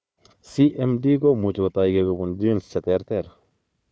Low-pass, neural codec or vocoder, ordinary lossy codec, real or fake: none; codec, 16 kHz, 4 kbps, FunCodec, trained on Chinese and English, 50 frames a second; none; fake